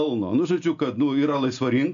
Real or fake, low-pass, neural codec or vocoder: real; 7.2 kHz; none